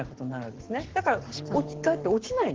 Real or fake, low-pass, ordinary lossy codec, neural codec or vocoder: fake; 7.2 kHz; Opus, 16 kbps; codec, 44.1 kHz, 7.8 kbps, Pupu-Codec